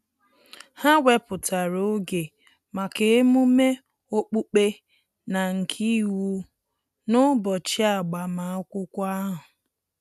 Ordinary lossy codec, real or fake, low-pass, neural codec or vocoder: none; real; 14.4 kHz; none